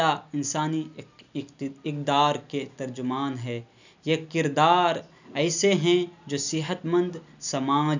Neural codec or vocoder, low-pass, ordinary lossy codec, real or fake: none; 7.2 kHz; none; real